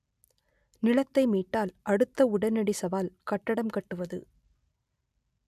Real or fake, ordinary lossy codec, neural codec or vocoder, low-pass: real; none; none; 14.4 kHz